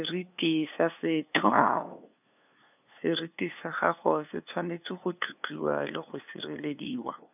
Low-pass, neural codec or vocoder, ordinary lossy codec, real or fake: 3.6 kHz; codec, 16 kHz, 2 kbps, FunCodec, trained on LibriTTS, 25 frames a second; none; fake